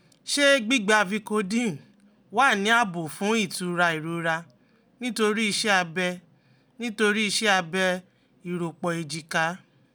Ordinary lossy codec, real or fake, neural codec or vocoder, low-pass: none; real; none; none